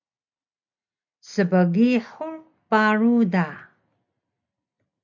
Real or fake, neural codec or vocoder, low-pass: real; none; 7.2 kHz